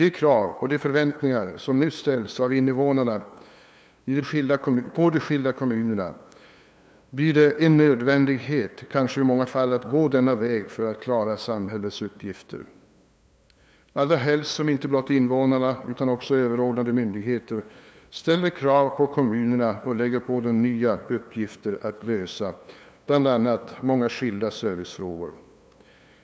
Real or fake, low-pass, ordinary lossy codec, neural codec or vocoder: fake; none; none; codec, 16 kHz, 2 kbps, FunCodec, trained on LibriTTS, 25 frames a second